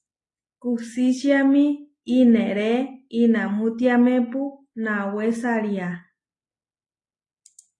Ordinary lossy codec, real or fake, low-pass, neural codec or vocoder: AAC, 32 kbps; real; 10.8 kHz; none